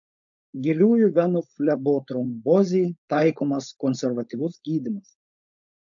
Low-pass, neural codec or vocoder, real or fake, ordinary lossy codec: 7.2 kHz; codec, 16 kHz, 4.8 kbps, FACodec; fake; AAC, 64 kbps